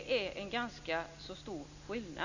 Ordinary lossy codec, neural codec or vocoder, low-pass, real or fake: none; none; 7.2 kHz; real